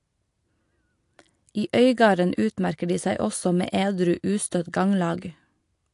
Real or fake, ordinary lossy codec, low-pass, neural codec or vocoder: real; AAC, 64 kbps; 10.8 kHz; none